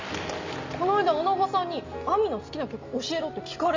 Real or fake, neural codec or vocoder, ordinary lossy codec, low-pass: real; none; MP3, 64 kbps; 7.2 kHz